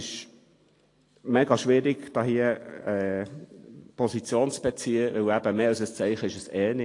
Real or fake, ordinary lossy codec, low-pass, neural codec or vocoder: real; AAC, 48 kbps; 10.8 kHz; none